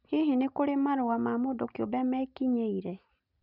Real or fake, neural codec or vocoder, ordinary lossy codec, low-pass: real; none; none; 5.4 kHz